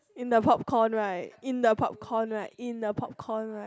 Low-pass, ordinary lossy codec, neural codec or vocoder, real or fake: none; none; none; real